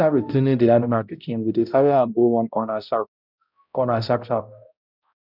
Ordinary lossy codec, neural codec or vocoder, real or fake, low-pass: none; codec, 16 kHz, 0.5 kbps, X-Codec, HuBERT features, trained on balanced general audio; fake; 5.4 kHz